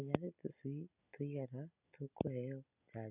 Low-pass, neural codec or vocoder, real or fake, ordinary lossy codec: 3.6 kHz; none; real; none